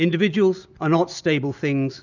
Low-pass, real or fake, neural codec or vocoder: 7.2 kHz; real; none